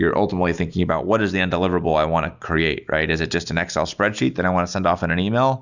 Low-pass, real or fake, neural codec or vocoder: 7.2 kHz; real; none